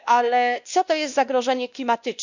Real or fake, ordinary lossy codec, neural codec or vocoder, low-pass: fake; none; codec, 16 kHz, 1 kbps, X-Codec, WavLM features, trained on Multilingual LibriSpeech; 7.2 kHz